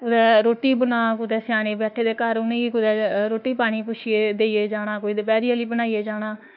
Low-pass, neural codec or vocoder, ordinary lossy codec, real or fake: 5.4 kHz; autoencoder, 48 kHz, 32 numbers a frame, DAC-VAE, trained on Japanese speech; none; fake